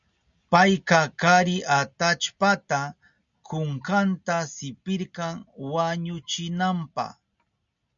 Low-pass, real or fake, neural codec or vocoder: 7.2 kHz; real; none